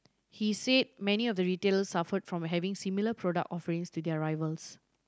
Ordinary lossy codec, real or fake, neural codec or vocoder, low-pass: none; real; none; none